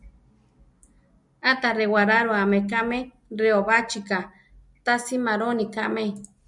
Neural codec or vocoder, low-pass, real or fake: none; 10.8 kHz; real